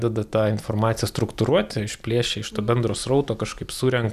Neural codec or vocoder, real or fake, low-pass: none; real; 14.4 kHz